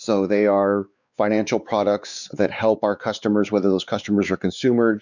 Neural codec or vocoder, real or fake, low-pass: codec, 16 kHz, 4 kbps, X-Codec, WavLM features, trained on Multilingual LibriSpeech; fake; 7.2 kHz